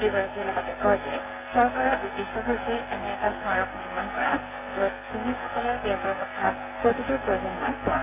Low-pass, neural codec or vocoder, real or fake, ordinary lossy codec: 3.6 kHz; codec, 44.1 kHz, 2.6 kbps, SNAC; fake; none